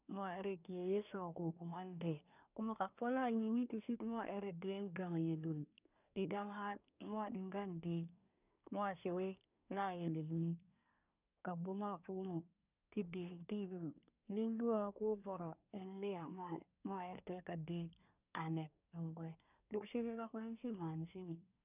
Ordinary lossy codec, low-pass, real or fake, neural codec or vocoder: none; 3.6 kHz; fake; codec, 24 kHz, 1 kbps, SNAC